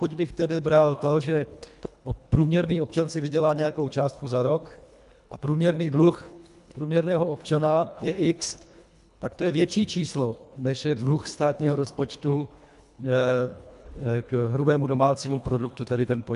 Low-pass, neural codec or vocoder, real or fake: 10.8 kHz; codec, 24 kHz, 1.5 kbps, HILCodec; fake